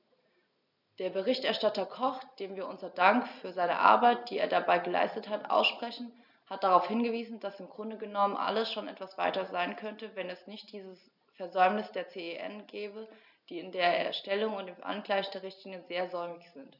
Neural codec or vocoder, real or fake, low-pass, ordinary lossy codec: none; real; 5.4 kHz; none